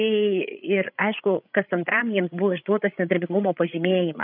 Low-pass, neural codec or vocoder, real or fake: 5.4 kHz; codec, 16 kHz, 8 kbps, FreqCodec, larger model; fake